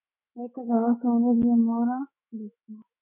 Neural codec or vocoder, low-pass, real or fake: autoencoder, 48 kHz, 128 numbers a frame, DAC-VAE, trained on Japanese speech; 3.6 kHz; fake